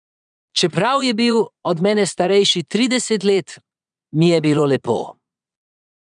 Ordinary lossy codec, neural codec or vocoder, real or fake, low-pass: none; vocoder, 22.05 kHz, 80 mel bands, Vocos; fake; 9.9 kHz